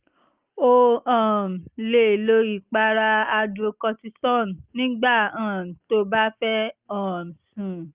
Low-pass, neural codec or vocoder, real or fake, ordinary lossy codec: 3.6 kHz; none; real; Opus, 24 kbps